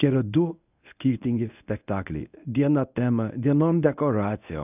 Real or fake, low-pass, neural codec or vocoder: fake; 3.6 kHz; codec, 24 kHz, 0.9 kbps, WavTokenizer, medium speech release version 1